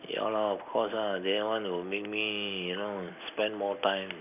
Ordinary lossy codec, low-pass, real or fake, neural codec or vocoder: none; 3.6 kHz; real; none